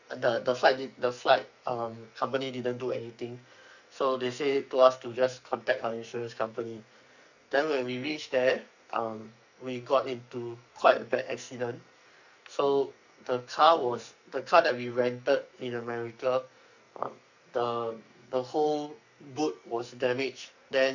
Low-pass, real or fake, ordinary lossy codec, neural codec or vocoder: 7.2 kHz; fake; none; codec, 44.1 kHz, 2.6 kbps, SNAC